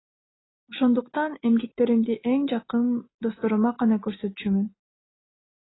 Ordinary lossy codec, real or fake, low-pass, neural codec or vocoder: AAC, 16 kbps; real; 7.2 kHz; none